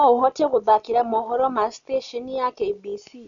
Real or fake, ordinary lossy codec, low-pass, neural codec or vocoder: real; none; 7.2 kHz; none